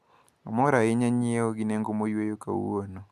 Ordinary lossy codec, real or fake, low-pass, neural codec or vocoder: MP3, 96 kbps; real; 14.4 kHz; none